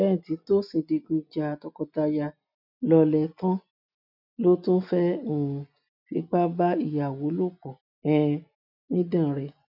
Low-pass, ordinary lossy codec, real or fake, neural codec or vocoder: 5.4 kHz; none; real; none